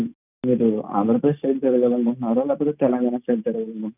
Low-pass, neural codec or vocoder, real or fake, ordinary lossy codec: 3.6 kHz; none; real; none